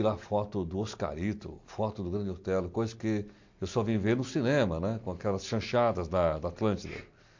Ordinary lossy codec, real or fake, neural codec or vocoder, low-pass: MP3, 48 kbps; real; none; 7.2 kHz